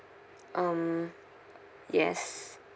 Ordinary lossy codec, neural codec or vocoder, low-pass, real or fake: none; none; none; real